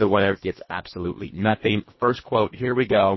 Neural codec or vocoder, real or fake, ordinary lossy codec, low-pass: codec, 24 kHz, 1.5 kbps, HILCodec; fake; MP3, 24 kbps; 7.2 kHz